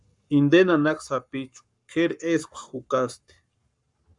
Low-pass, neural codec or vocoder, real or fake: 10.8 kHz; codec, 44.1 kHz, 7.8 kbps, Pupu-Codec; fake